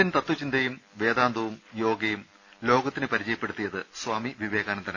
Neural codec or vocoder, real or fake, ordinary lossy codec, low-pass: none; real; none; 7.2 kHz